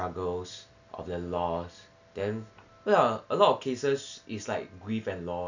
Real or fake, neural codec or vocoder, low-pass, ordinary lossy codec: real; none; 7.2 kHz; none